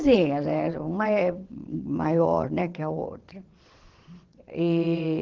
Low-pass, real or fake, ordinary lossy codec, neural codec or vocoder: 7.2 kHz; fake; Opus, 16 kbps; vocoder, 22.05 kHz, 80 mel bands, WaveNeXt